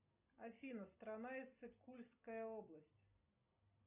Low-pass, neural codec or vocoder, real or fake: 3.6 kHz; none; real